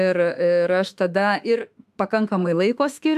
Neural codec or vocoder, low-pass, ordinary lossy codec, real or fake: autoencoder, 48 kHz, 32 numbers a frame, DAC-VAE, trained on Japanese speech; 14.4 kHz; AAC, 96 kbps; fake